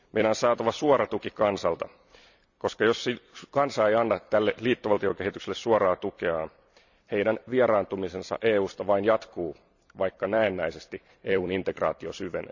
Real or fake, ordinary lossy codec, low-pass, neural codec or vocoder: fake; none; 7.2 kHz; vocoder, 44.1 kHz, 128 mel bands every 256 samples, BigVGAN v2